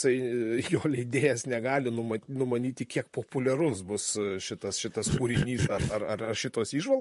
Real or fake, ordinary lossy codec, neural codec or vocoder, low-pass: fake; MP3, 48 kbps; vocoder, 44.1 kHz, 128 mel bands, Pupu-Vocoder; 14.4 kHz